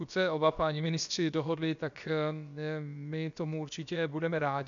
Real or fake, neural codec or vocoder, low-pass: fake; codec, 16 kHz, about 1 kbps, DyCAST, with the encoder's durations; 7.2 kHz